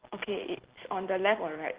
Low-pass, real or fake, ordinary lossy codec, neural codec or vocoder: 3.6 kHz; real; Opus, 16 kbps; none